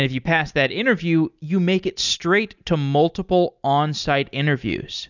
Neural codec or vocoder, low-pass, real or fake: none; 7.2 kHz; real